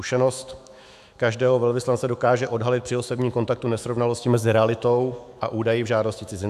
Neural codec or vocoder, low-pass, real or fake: autoencoder, 48 kHz, 128 numbers a frame, DAC-VAE, trained on Japanese speech; 14.4 kHz; fake